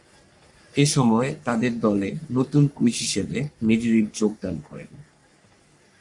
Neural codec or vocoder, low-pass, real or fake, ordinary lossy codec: codec, 44.1 kHz, 3.4 kbps, Pupu-Codec; 10.8 kHz; fake; MP3, 64 kbps